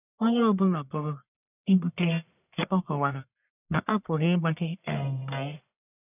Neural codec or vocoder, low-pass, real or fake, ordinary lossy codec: codec, 44.1 kHz, 1.7 kbps, Pupu-Codec; 3.6 kHz; fake; none